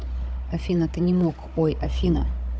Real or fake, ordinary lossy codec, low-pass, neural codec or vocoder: fake; none; none; codec, 16 kHz, 16 kbps, FunCodec, trained on Chinese and English, 50 frames a second